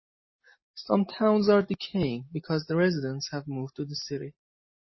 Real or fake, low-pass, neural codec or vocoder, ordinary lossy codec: real; 7.2 kHz; none; MP3, 24 kbps